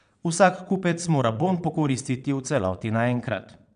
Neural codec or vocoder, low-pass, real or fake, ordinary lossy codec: vocoder, 22.05 kHz, 80 mel bands, Vocos; 9.9 kHz; fake; none